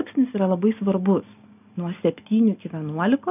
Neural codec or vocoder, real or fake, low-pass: none; real; 3.6 kHz